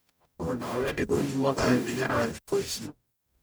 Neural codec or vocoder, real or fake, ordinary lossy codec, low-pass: codec, 44.1 kHz, 0.9 kbps, DAC; fake; none; none